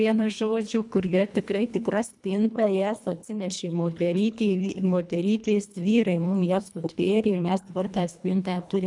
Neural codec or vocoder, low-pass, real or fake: codec, 24 kHz, 1.5 kbps, HILCodec; 10.8 kHz; fake